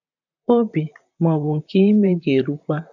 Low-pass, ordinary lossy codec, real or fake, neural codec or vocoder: 7.2 kHz; none; fake; vocoder, 44.1 kHz, 128 mel bands, Pupu-Vocoder